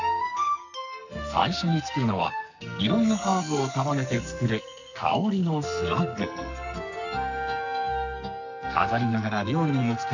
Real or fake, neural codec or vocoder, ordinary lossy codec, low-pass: fake; codec, 44.1 kHz, 2.6 kbps, SNAC; none; 7.2 kHz